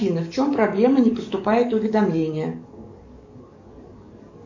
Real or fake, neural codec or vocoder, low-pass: fake; codec, 44.1 kHz, 7.8 kbps, DAC; 7.2 kHz